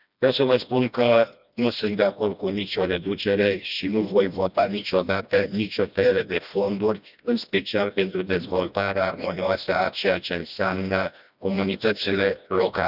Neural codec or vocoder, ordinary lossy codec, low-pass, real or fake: codec, 16 kHz, 1 kbps, FreqCodec, smaller model; none; 5.4 kHz; fake